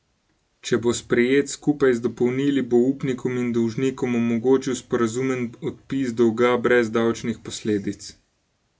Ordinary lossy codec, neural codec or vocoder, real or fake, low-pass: none; none; real; none